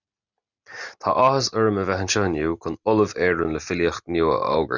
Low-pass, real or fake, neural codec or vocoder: 7.2 kHz; real; none